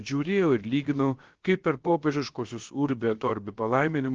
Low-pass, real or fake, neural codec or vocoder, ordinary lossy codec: 7.2 kHz; fake; codec, 16 kHz, about 1 kbps, DyCAST, with the encoder's durations; Opus, 16 kbps